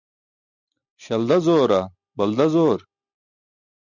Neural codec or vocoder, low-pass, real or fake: none; 7.2 kHz; real